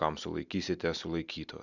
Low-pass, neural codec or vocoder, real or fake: 7.2 kHz; none; real